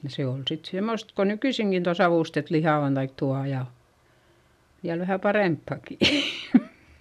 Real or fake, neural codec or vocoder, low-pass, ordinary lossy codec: real; none; 14.4 kHz; none